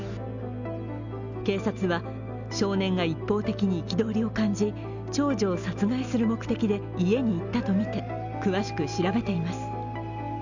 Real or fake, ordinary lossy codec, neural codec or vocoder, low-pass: real; none; none; 7.2 kHz